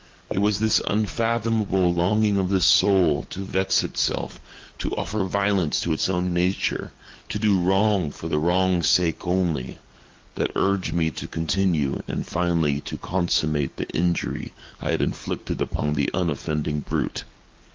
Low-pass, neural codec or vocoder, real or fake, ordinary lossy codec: 7.2 kHz; codec, 44.1 kHz, 7.8 kbps, DAC; fake; Opus, 24 kbps